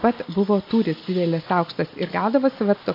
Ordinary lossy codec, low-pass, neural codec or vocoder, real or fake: AAC, 32 kbps; 5.4 kHz; none; real